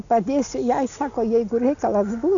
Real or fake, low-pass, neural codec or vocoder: real; 7.2 kHz; none